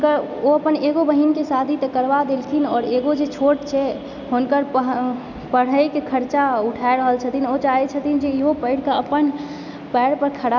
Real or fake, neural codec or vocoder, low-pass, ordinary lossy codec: real; none; 7.2 kHz; none